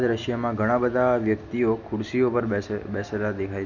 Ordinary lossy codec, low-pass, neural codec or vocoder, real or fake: none; 7.2 kHz; none; real